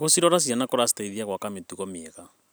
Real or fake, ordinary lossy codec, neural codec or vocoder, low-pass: real; none; none; none